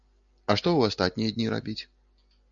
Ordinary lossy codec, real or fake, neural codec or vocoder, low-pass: MP3, 96 kbps; real; none; 7.2 kHz